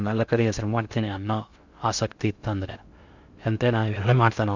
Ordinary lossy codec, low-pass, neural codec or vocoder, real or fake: Opus, 64 kbps; 7.2 kHz; codec, 16 kHz in and 24 kHz out, 0.6 kbps, FocalCodec, streaming, 2048 codes; fake